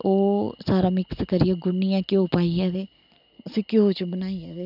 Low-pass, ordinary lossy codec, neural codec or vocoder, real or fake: 5.4 kHz; none; none; real